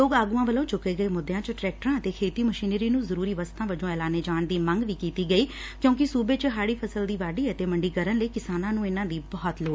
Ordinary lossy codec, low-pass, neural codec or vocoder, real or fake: none; none; none; real